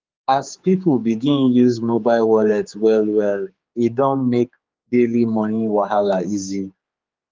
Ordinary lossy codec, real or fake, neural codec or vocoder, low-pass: Opus, 32 kbps; fake; codec, 16 kHz, 4 kbps, X-Codec, HuBERT features, trained on general audio; 7.2 kHz